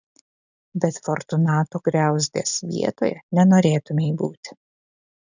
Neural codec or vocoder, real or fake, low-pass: none; real; 7.2 kHz